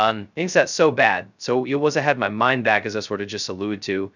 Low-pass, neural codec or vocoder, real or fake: 7.2 kHz; codec, 16 kHz, 0.2 kbps, FocalCodec; fake